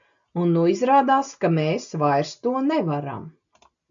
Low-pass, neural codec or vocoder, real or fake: 7.2 kHz; none; real